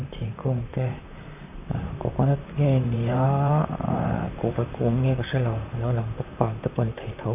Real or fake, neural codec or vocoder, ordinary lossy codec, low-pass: fake; vocoder, 44.1 kHz, 128 mel bands, Pupu-Vocoder; none; 3.6 kHz